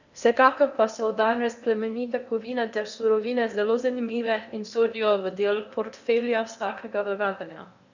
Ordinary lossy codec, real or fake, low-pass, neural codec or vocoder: none; fake; 7.2 kHz; codec, 16 kHz in and 24 kHz out, 0.8 kbps, FocalCodec, streaming, 65536 codes